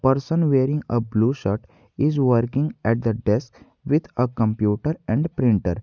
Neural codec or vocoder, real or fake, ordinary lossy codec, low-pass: none; real; none; 7.2 kHz